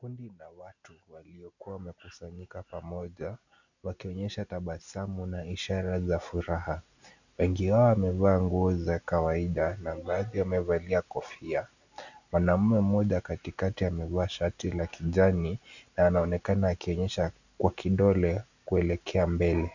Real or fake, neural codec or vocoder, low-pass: real; none; 7.2 kHz